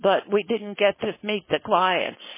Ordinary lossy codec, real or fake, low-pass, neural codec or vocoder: MP3, 16 kbps; fake; 3.6 kHz; codec, 16 kHz, 4.8 kbps, FACodec